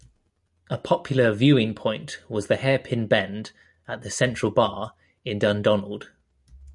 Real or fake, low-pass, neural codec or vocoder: real; 10.8 kHz; none